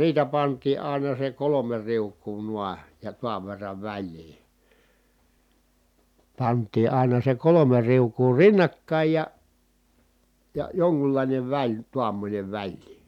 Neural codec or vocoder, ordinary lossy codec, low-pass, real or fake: none; none; 19.8 kHz; real